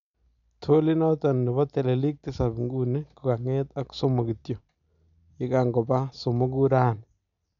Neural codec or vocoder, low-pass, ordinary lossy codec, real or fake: none; 7.2 kHz; MP3, 96 kbps; real